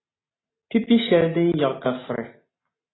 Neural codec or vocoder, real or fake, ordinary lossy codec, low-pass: none; real; AAC, 16 kbps; 7.2 kHz